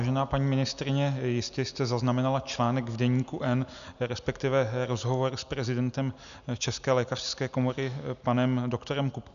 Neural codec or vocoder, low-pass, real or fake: none; 7.2 kHz; real